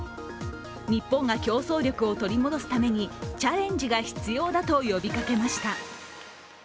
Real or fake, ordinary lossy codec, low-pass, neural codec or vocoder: real; none; none; none